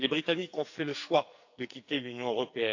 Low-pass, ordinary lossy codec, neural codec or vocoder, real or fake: 7.2 kHz; AAC, 48 kbps; codec, 44.1 kHz, 2.6 kbps, SNAC; fake